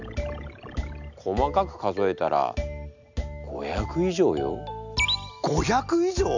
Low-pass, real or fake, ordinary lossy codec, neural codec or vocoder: 7.2 kHz; real; none; none